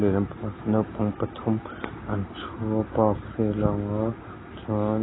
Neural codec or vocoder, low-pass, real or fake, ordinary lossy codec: none; 7.2 kHz; real; AAC, 16 kbps